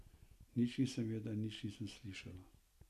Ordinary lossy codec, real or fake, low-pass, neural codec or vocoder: none; real; 14.4 kHz; none